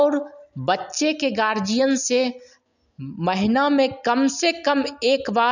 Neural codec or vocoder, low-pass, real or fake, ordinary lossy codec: none; 7.2 kHz; real; none